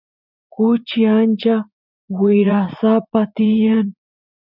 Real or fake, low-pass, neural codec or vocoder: fake; 5.4 kHz; vocoder, 44.1 kHz, 80 mel bands, Vocos